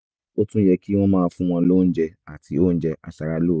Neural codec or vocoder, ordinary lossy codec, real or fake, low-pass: none; none; real; none